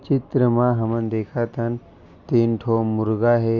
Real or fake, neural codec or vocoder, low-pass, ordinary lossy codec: real; none; 7.2 kHz; Opus, 64 kbps